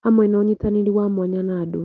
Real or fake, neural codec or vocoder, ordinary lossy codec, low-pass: real; none; Opus, 16 kbps; 7.2 kHz